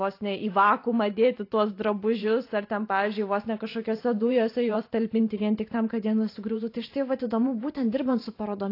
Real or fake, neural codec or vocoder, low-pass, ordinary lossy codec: fake; vocoder, 44.1 kHz, 128 mel bands every 512 samples, BigVGAN v2; 5.4 kHz; AAC, 32 kbps